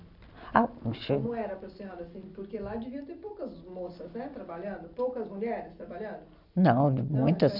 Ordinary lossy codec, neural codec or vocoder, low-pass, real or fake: none; none; 5.4 kHz; real